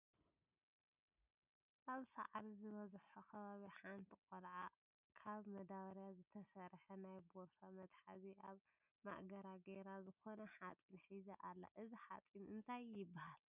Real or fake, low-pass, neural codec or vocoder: real; 3.6 kHz; none